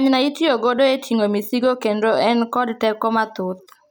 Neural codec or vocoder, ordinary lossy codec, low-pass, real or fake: none; none; none; real